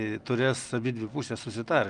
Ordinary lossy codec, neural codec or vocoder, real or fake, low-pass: Opus, 32 kbps; none; real; 9.9 kHz